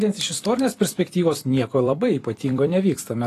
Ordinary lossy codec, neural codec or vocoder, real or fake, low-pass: AAC, 48 kbps; vocoder, 44.1 kHz, 128 mel bands every 256 samples, BigVGAN v2; fake; 14.4 kHz